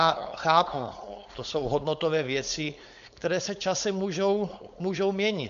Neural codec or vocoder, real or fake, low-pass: codec, 16 kHz, 4.8 kbps, FACodec; fake; 7.2 kHz